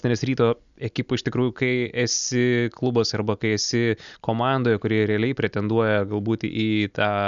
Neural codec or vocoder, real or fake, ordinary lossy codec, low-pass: none; real; Opus, 64 kbps; 7.2 kHz